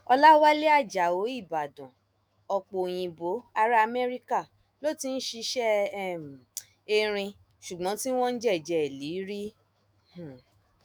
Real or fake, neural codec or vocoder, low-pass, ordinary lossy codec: fake; autoencoder, 48 kHz, 128 numbers a frame, DAC-VAE, trained on Japanese speech; none; none